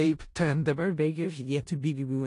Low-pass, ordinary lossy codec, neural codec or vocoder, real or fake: 10.8 kHz; AAC, 48 kbps; codec, 16 kHz in and 24 kHz out, 0.4 kbps, LongCat-Audio-Codec, four codebook decoder; fake